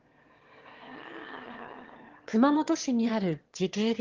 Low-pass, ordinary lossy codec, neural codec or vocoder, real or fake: 7.2 kHz; Opus, 16 kbps; autoencoder, 22.05 kHz, a latent of 192 numbers a frame, VITS, trained on one speaker; fake